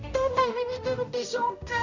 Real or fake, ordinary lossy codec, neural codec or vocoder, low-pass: fake; none; codec, 16 kHz, 0.5 kbps, X-Codec, HuBERT features, trained on general audio; 7.2 kHz